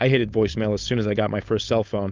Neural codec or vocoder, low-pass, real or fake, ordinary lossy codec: codec, 16 kHz, 4.8 kbps, FACodec; 7.2 kHz; fake; Opus, 24 kbps